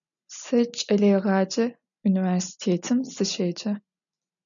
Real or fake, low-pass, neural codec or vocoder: real; 7.2 kHz; none